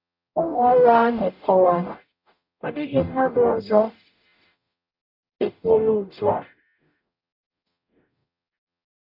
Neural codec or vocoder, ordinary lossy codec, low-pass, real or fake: codec, 44.1 kHz, 0.9 kbps, DAC; none; 5.4 kHz; fake